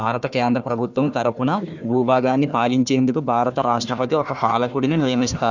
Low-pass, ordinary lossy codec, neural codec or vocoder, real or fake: 7.2 kHz; none; codec, 16 kHz, 1 kbps, FunCodec, trained on Chinese and English, 50 frames a second; fake